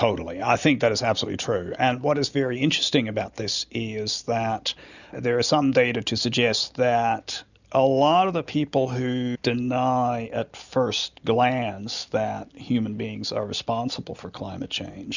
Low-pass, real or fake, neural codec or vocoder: 7.2 kHz; real; none